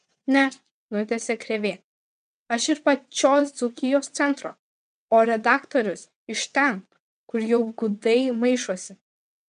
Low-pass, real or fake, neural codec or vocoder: 9.9 kHz; fake; vocoder, 22.05 kHz, 80 mel bands, WaveNeXt